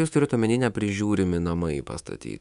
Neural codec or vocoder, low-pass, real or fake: codec, 24 kHz, 3.1 kbps, DualCodec; 10.8 kHz; fake